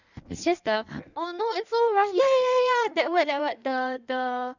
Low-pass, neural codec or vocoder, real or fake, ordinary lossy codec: 7.2 kHz; codec, 16 kHz in and 24 kHz out, 1.1 kbps, FireRedTTS-2 codec; fake; none